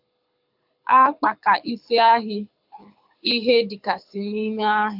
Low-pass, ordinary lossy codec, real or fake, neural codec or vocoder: 5.4 kHz; AAC, 48 kbps; fake; codec, 24 kHz, 6 kbps, HILCodec